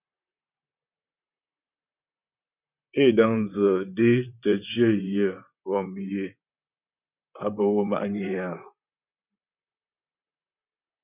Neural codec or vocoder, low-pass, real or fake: vocoder, 44.1 kHz, 128 mel bands, Pupu-Vocoder; 3.6 kHz; fake